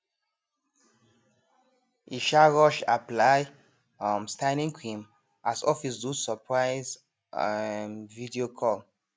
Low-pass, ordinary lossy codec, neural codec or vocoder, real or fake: none; none; none; real